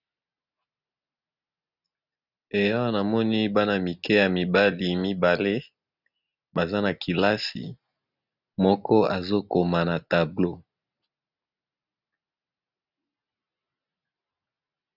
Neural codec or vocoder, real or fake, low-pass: none; real; 5.4 kHz